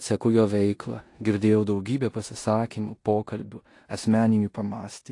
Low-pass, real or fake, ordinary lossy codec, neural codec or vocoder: 10.8 kHz; fake; AAC, 48 kbps; codec, 16 kHz in and 24 kHz out, 0.9 kbps, LongCat-Audio-Codec, four codebook decoder